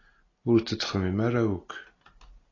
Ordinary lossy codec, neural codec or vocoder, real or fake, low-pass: AAC, 32 kbps; none; real; 7.2 kHz